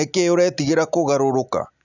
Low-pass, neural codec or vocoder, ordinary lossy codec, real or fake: 7.2 kHz; none; none; real